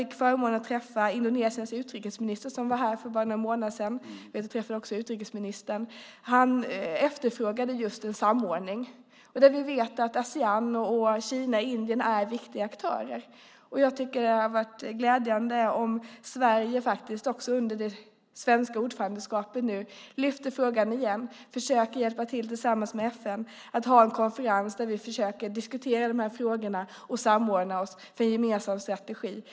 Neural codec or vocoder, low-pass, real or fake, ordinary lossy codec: none; none; real; none